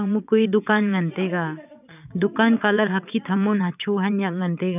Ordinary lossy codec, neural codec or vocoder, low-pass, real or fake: none; vocoder, 22.05 kHz, 80 mel bands, WaveNeXt; 3.6 kHz; fake